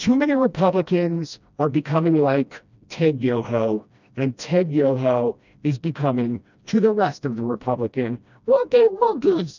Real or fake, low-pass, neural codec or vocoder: fake; 7.2 kHz; codec, 16 kHz, 1 kbps, FreqCodec, smaller model